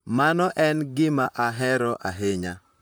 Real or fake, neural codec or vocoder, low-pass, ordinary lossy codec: fake; vocoder, 44.1 kHz, 128 mel bands, Pupu-Vocoder; none; none